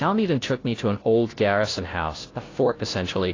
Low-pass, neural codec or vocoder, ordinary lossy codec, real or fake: 7.2 kHz; codec, 16 kHz, 0.5 kbps, FunCodec, trained on Chinese and English, 25 frames a second; AAC, 32 kbps; fake